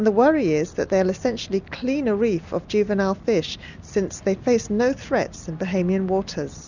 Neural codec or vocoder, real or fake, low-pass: none; real; 7.2 kHz